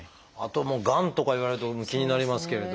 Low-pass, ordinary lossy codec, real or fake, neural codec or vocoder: none; none; real; none